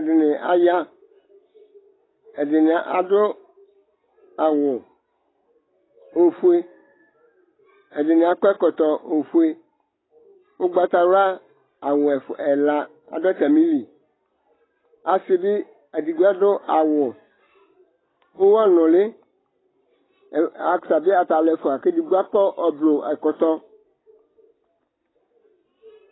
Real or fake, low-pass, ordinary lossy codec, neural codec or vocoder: real; 7.2 kHz; AAC, 16 kbps; none